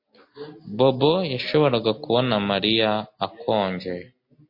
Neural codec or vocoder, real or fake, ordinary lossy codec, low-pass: none; real; MP3, 32 kbps; 5.4 kHz